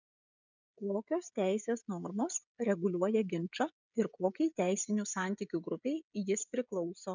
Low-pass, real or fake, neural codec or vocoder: 7.2 kHz; fake; codec, 16 kHz, 16 kbps, FreqCodec, smaller model